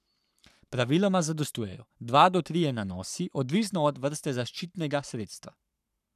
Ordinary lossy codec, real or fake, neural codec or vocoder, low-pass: none; fake; codec, 44.1 kHz, 7.8 kbps, Pupu-Codec; 14.4 kHz